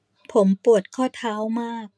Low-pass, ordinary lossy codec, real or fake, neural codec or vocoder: none; none; real; none